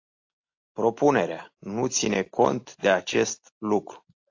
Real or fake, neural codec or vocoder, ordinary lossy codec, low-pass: real; none; AAC, 48 kbps; 7.2 kHz